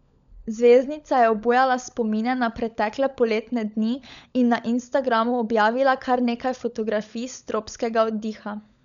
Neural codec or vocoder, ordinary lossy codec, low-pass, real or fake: codec, 16 kHz, 16 kbps, FunCodec, trained on LibriTTS, 50 frames a second; none; 7.2 kHz; fake